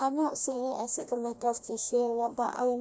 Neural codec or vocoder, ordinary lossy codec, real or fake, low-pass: codec, 16 kHz, 1 kbps, FreqCodec, larger model; none; fake; none